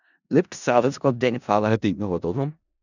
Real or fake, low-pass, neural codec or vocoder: fake; 7.2 kHz; codec, 16 kHz in and 24 kHz out, 0.4 kbps, LongCat-Audio-Codec, four codebook decoder